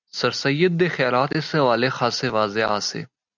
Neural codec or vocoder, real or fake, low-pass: none; real; 7.2 kHz